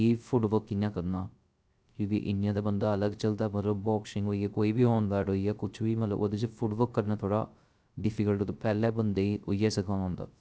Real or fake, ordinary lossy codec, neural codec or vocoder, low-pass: fake; none; codec, 16 kHz, 0.3 kbps, FocalCodec; none